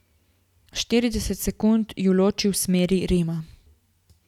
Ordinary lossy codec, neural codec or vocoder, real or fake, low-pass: none; none; real; 19.8 kHz